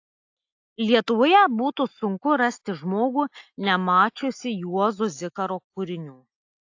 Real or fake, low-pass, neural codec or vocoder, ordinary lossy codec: real; 7.2 kHz; none; AAC, 48 kbps